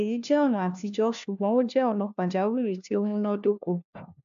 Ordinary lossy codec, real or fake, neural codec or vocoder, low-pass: AAC, 64 kbps; fake; codec, 16 kHz, 1 kbps, FunCodec, trained on LibriTTS, 50 frames a second; 7.2 kHz